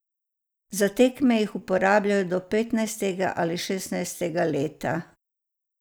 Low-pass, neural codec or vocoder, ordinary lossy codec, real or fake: none; none; none; real